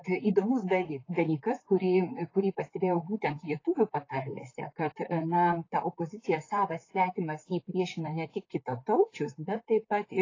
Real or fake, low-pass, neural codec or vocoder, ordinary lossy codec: fake; 7.2 kHz; vocoder, 44.1 kHz, 128 mel bands, Pupu-Vocoder; AAC, 32 kbps